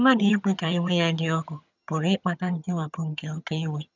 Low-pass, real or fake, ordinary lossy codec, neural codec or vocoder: 7.2 kHz; fake; none; vocoder, 22.05 kHz, 80 mel bands, HiFi-GAN